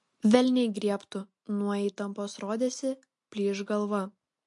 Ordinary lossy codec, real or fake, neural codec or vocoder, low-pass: MP3, 48 kbps; real; none; 10.8 kHz